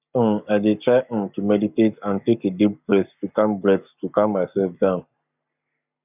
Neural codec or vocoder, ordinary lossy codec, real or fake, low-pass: codec, 44.1 kHz, 7.8 kbps, Pupu-Codec; none; fake; 3.6 kHz